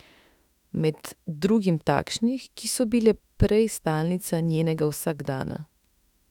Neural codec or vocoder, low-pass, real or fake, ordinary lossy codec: autoencoder, 48 kHz, 32 numbers a frame, DAC-VAE, trained on Japanese speech; 19.8 kHz; fake; none